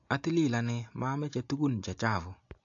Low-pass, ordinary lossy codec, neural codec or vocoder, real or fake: 7.2 kHz; AAC, 48 kbps; none; real